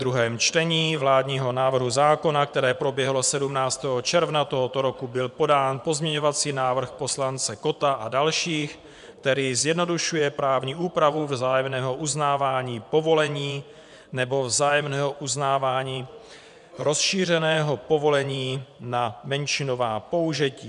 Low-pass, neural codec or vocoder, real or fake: 10.8 kHz; vocoder, 24 kHz, 100 mel bands, Vocos; fake